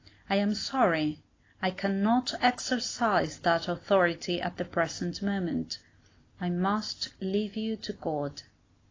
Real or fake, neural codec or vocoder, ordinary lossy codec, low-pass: real; none; AAC, 32 kbps; 7.2 kHz